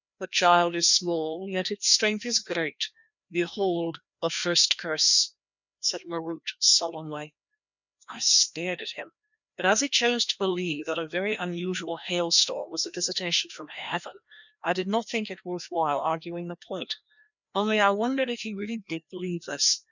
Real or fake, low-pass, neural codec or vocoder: fake; 7.2 kHz; codec, 16 kHz, 1 kbps, FreqCodec, larger model